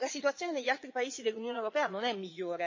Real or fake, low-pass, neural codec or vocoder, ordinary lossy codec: fake; 7.2 kHz; vocoder, 22.05 kHz, 80 mel bands, Vocos; MP3, 32 kbps